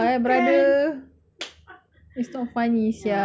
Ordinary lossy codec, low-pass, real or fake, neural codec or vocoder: none; none; real; none